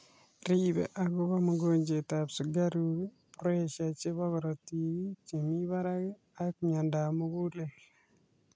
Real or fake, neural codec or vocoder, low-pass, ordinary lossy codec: real; none; none; none